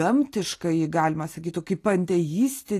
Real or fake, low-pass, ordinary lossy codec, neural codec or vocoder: real; 14.4 kHz; AAC, 48 kbps; none